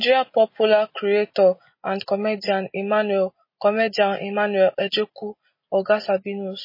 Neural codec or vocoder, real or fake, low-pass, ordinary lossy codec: none; real; 5.4 kHz; MP3, 24 kbps